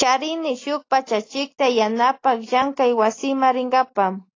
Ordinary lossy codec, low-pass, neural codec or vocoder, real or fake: AAC, 32 kbps; 7.2 kHz; none; real